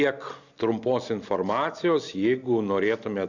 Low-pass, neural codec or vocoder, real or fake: 7.2 kHz; none; real